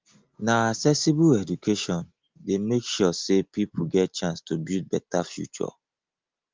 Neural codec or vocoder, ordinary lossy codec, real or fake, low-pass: none; Opus, 32 kbps; real; 7.2 kHz